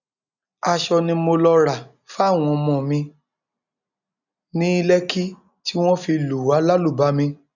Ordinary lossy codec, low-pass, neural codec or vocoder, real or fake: none; 7.2 kHz; none; real